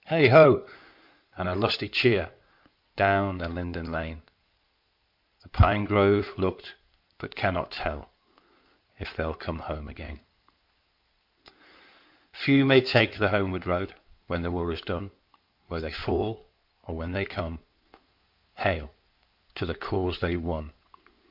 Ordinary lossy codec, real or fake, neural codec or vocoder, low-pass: MP3, 48 kbps; fake; codec, 16 kHz in and 24 kHz out, 2.2 kbps, FireRedTTS-2 codec; 5.4 kHz